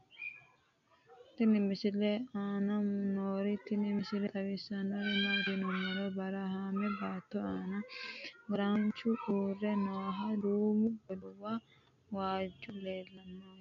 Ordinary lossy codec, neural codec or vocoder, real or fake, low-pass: AAC, 64 kbps; none; real; 7.2 kHz